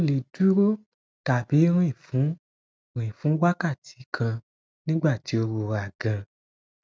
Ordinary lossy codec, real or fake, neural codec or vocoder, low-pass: none; real; none; none